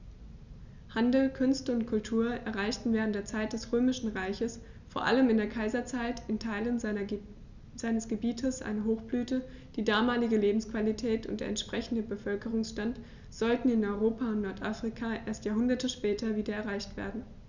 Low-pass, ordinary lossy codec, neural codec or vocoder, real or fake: 7.2 kHz; none; none; real